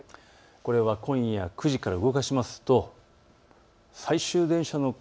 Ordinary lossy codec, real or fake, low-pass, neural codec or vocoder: none; real; none; none